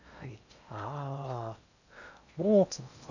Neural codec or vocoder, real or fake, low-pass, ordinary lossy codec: codec, 16 kHz in and 24 kHz out, 0.6 kbps, FocalCodec, streaming, 4096 codes; fake; 7.2 kHz; none